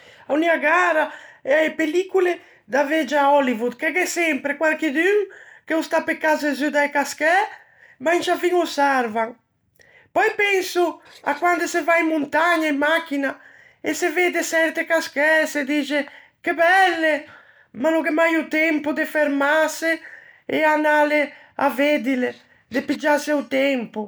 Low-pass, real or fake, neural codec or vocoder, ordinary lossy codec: none; real; none; none